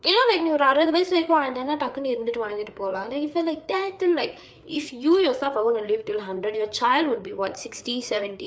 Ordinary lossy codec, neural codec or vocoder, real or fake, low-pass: none; codec, 16 kHz, 4 kbps, FreqCodec, larger model; fake; none